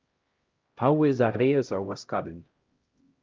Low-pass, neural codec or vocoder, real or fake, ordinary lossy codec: 7.2 kHz; codec, 16 kHz, 0.5 kbps, X-Codec, HuBERT features, trained on LibriSpeech; fake; Opus, 24 kbps